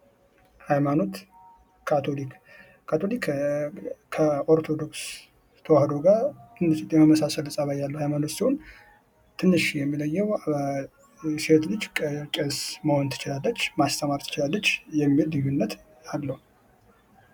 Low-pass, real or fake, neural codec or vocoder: 19.8 kHz; real; none